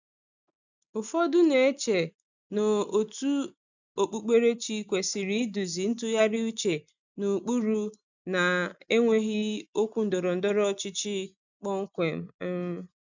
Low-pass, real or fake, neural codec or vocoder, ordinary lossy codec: 7.2 kHz; real; none; none